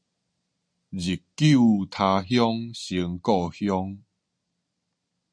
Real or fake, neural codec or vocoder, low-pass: real; none; 9.9 kHz